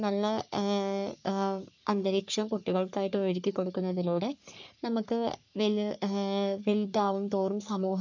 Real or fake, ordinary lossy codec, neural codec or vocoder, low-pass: fake; none; codec, 44.1 kHz, 3.4 kbps, Pupu-Codec; 7.2 kHz